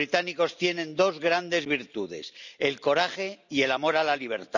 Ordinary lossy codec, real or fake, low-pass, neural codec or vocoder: none; real; 7.2 kHz; none